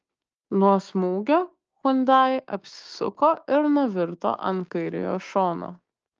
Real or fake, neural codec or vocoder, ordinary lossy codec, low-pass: fake; codec, 16 kHz, 6 kbps, DAC; Opus, 24 kbps; 7.2 kHz